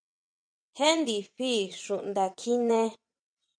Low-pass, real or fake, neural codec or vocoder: 9.9 kHz; fake; vocoder, 22.05 kHz, 80 mel bands, WaveNeXt